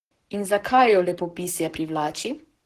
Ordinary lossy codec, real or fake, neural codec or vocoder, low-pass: Opus, 16 kbps; fake; codec, 44.1 kHz, 7.8 kbps, Pupu-Codec; 14.4 kHz